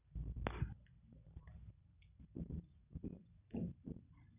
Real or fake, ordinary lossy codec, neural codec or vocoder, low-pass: fake; none; vocoder, 44.1 kHz, 128 mel bands every 256 samples, BigVGAN v2; 3.6 kHz